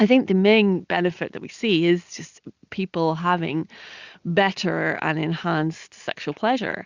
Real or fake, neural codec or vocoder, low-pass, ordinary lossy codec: real; none; 7.2 kHz; Opus, 64 kbps